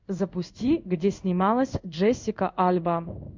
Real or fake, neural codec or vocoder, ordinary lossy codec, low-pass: fake; codec, 16 kHz in and 24 kHz out, 1 kbps, XY-Tokenizer; MP3, 64 kbps; 7.2 kHz